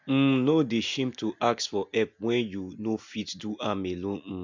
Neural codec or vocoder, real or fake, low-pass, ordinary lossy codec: none; real; 7.2 kHz; MP3, 64 kbps